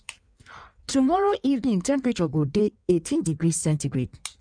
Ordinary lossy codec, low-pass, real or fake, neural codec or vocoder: none; 9.9 kHz; fake; codec, 16 kHz in and 24 kHz out, 1.1 kbps, FireRedTTS-2 codec